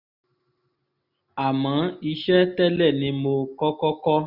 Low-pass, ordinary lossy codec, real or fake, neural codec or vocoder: 5.4 kHz; none; real; none